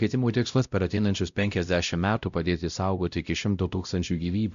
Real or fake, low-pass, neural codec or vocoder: fake; 7.2 kHz; codec, 16 kHz, 0.5 kbps, X-Codec, WavLM features, trained on Multilingual LibriSpeech